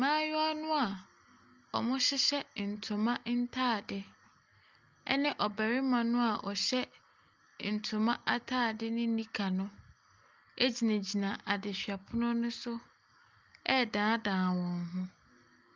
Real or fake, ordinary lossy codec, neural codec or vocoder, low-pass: real; Opus, 32 kbps; none; 7.2 kHz